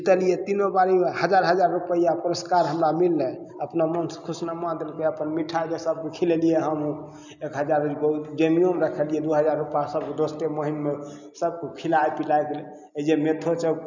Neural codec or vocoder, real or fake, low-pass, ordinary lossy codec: none; real; 7.2 kHz; none